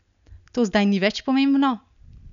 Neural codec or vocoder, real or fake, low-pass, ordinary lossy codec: none; real; 7.2 kHz; none